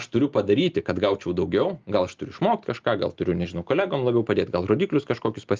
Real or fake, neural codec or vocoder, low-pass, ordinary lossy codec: real; none; 7.2 kHz; Opus, 32 kbps